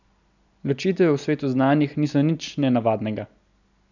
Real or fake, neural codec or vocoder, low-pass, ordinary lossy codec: real; none; 7.2 kHz; none